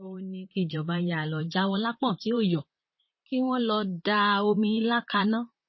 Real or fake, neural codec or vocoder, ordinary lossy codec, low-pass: fake; vocoder, 44.1 kHz, 128 mel bands, Pupu-Vocoder; MP3, 24 kbps; 7.2 kHz